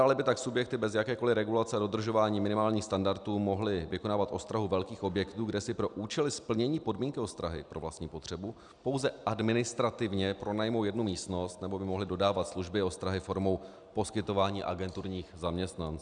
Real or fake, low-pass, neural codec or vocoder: real; 9.9 kHz; none